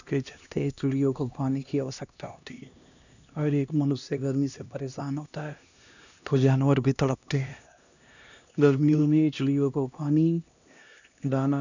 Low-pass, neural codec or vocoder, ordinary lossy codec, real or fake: 7.2 kHz; codec, 16 kHz, 1 kbps, X-Codec, HuBERT features, trained on LibriSpeech; none; fake